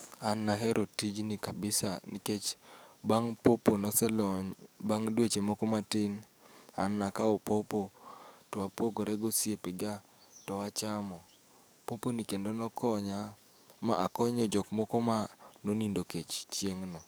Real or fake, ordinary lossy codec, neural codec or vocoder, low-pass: fake; none; codec, 44.1 kHz, 7.8 kbps, DAC; none